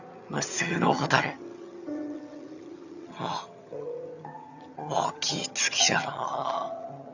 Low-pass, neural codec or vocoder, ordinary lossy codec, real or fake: 7.2 kHz; vocoder, 22.05 kHz, 80 mel bands, HiFi-GAN; none; fake